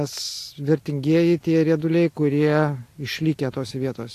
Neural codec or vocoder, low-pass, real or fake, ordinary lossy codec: none; 14.4 kHz; real; AAC, 64 kbps